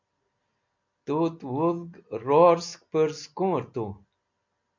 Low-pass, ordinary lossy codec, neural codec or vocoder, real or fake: 7.2 kHz; MP3, 64 kbps; none; real